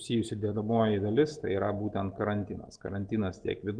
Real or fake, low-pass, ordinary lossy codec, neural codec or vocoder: real; 9.9 kHz; Opus, 16 kbps; none